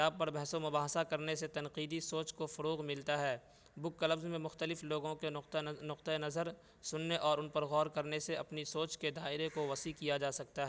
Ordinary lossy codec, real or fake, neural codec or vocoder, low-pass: none; real; none; none